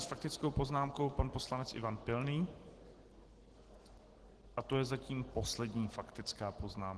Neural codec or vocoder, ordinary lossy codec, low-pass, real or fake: none; Opus, 16 kbps; 10.8 kHz; real